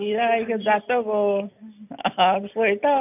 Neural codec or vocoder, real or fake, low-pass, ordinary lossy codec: none; real; 3.6 kHz; none